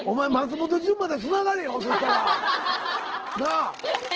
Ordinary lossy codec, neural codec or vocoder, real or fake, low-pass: Opus, 16 kbps; none; real; 7.2 kHz